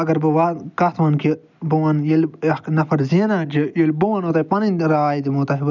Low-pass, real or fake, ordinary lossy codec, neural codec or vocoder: 7.2 kHz; real; none; none